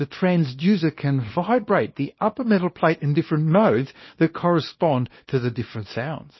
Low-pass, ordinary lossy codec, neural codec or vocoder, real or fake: 7.2 kHz; MP3, 24 kbps; codec, 24 kHz, 0.9 kbps, WavTokenizer, small release; fake